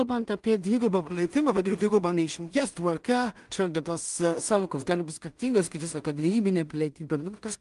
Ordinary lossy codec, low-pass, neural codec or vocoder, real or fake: Opus, 32 kbps; 10.8 kHz; codec, 16 kHz in and 24 kHz out, 0.4 kbps, LongCat-Audio-Codec, two codebook decoder; fake